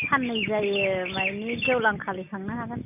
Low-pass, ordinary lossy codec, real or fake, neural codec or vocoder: 3.6 kHz; none; real; none